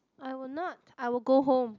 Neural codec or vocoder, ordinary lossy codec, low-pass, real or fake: none; none; 7.2 kHz; real